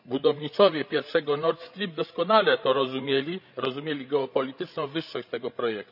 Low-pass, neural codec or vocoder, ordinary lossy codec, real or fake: 5.4 kHz; codec, 16 kHz, 16 kbps, FreqCodec, larger model; none; fake